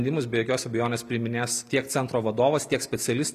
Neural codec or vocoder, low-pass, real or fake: none; 14.4 kHz; real